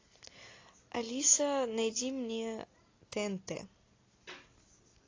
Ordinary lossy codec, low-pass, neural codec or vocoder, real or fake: AAC, 32 kbps; 7.2 kHz; none; real